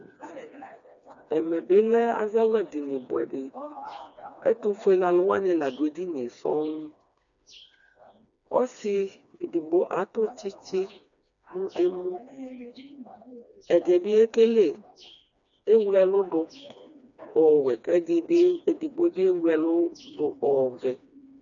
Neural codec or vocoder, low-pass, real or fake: codec, 16 kHz, 2 kbps, FreqCodec, smaller model; 7.2 kHz; fake